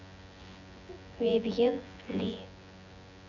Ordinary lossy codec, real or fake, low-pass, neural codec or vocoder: none; fake; 7.2 kHz; vocoder, 24 kHz, 100 mel bands, Vocos